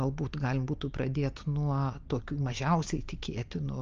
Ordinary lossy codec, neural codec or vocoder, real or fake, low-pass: Opus, 24 kbps; none; real; 7.2 kHz